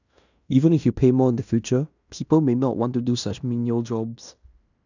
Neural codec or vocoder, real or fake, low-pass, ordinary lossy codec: codec, 16 kHz in and 24 kHz out, 0.9 kbps, LongCat-Audio-Codec, fine tuned four codebook decoder; fake; 7.2 kHz; none